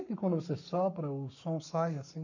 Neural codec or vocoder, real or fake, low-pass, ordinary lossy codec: codec, 44.1 kHz, 7.8 kbps, Pupu-Codec; fake; 7.2 kHz; none